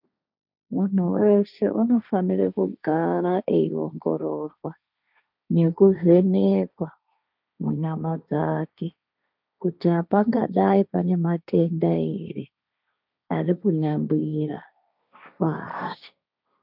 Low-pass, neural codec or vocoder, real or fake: 5.4 kHz; codec, 16 kHz, 1.1 kbps, Voila-Tokenizer; fake